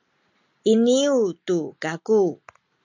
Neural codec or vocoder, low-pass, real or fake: none; 7.2 kHz; real